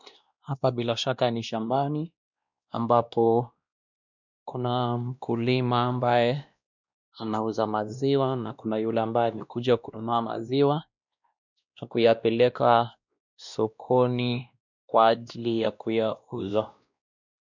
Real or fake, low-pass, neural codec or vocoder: fake; 7.2 kHz; codec, 16 kHz, 1 kbps, X-Codec, WavLM features, trained on Multilingual LibriSpeech